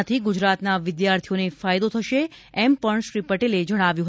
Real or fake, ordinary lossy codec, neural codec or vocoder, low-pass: real; none; none; none